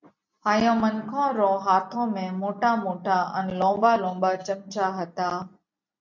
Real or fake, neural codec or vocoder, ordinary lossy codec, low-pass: real; none; MP3, 64 kbps; 7.2 kHz